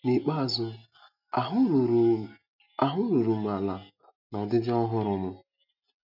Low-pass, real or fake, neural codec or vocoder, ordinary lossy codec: 5.4 kHz; real; none; none